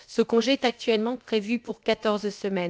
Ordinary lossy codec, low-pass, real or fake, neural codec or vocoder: none; none; fake; codec, 16 kHz, about 1 kbps, DyCAST, with the encoder's durations